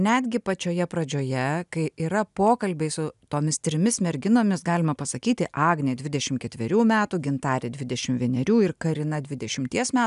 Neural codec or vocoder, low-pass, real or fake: none; 10.8 kHz; real